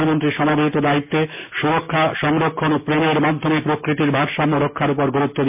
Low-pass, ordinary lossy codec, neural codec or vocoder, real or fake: 3.6 kHz; MP3, 32 kbps; none; real